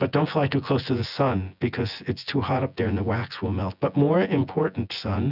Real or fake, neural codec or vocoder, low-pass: fake; vocoder, 24 kHz, 100 mel bands, Vocos; 5.4 kHz